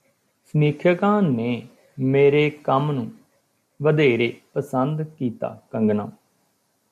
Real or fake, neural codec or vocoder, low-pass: real; none; 14.4 kHz